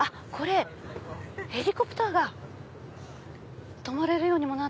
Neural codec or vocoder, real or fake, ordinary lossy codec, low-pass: none; real; none; none